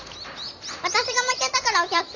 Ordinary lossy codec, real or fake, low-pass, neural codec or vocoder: none; real; 7.2 kHz; none